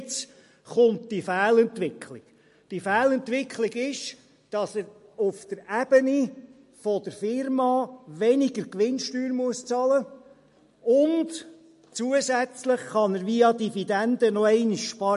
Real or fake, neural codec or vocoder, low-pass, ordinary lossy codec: real; none; 14.4 kHz; MP3, 48 kbps